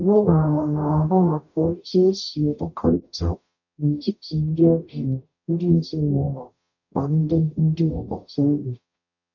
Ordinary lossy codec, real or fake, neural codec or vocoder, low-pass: none; fake; codec, 44.1 kHz, 0.9 kbps, DAC; 7.2 kHz